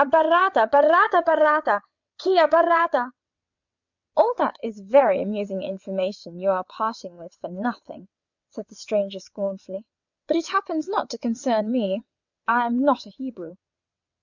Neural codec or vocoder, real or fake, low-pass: vocoder, 22.05 kHz, 80 mel bands, WaveNeXt; fake; 7.2 kHz